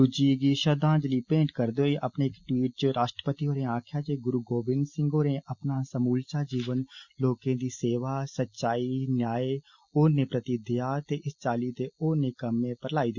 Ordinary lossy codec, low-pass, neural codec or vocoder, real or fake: Opus, 64 kbps; 7.2 kHz; none; real